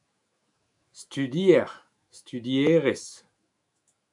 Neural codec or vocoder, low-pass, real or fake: autoencoder, 48 kHz, 128 numbers a frame, DAC-VAE, trained on Japanese speech; 10.8 kHz; fake